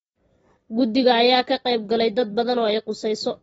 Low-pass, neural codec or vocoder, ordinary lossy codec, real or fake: 10.8 kHz; none; AAC, 24 kbps; real